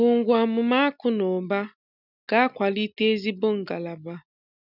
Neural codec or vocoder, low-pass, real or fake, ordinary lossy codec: none; 5.4 kHz; real; none